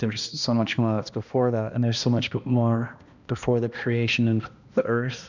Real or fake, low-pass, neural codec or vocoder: fake; 7.2 kHz; codec, 16 kHz, 1 kbps, X-Codec, HuBERT features, trained on balanced general audio